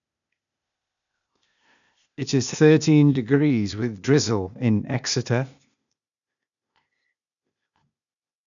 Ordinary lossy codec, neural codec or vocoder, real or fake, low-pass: none; codec, 16 kHz, 0.8 kbps, ZipCodec; fake; 7.2 kHz